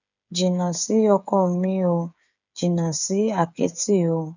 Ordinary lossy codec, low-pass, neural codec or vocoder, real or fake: none; 7.2 kHz; codec, 16 kHz, 8 kbps, FreqCodec, smaller model; fake